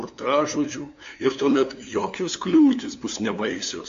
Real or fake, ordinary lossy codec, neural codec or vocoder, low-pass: fake; AAC, 48 kbps; codec, 16 kHz, 2 kbps, FunCodec, trained on LibriTTS, 25 frames a second; 7.2 kHz